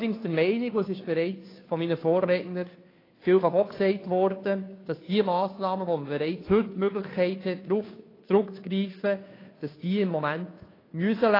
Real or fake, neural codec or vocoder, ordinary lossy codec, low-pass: fake; codec, 16 kHz, 2 kbps, FunCodec, trained on Chinese and English, 25 frames a second; AAC, 24 kbps; 5.4 kHz